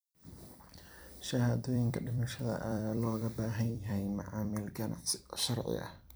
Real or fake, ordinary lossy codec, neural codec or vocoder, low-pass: fake; none; vocoder, 44.1 kHz, 128 mel bands every 256 samples, BigVGAN v2; none